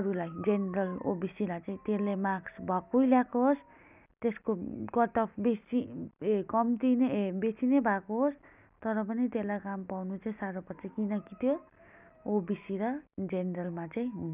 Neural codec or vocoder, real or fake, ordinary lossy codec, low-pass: none; real; none; 3.6 kHz